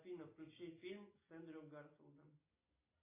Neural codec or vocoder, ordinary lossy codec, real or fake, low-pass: none; AAC, 24 kbps; real; 3.6 kHz